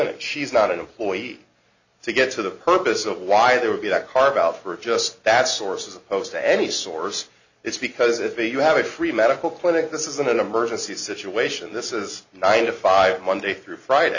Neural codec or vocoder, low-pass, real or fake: none; 7.2 kHz; real